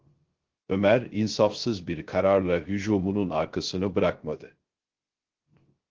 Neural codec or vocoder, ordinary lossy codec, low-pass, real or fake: codec, 16 kHz, 0.3 kbps, FocalCodec; Opus, 16 kbps; 7.2 kHz; fake